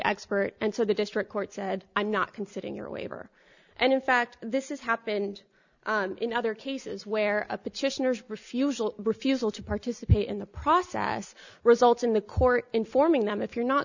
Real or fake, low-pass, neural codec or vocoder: real; 7.2 kHz; none